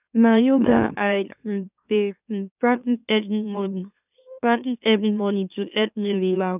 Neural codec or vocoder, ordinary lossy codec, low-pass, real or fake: autoencoder, 44.1 kHz, a latent of 192 numbers a frame, MeloTTS; none; 3.6 kHz; fake